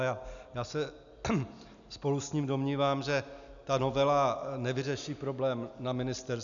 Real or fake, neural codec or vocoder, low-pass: real; none; 7.2 kHz